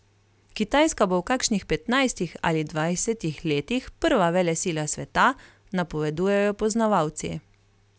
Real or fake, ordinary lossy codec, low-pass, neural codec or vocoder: real; none; none; none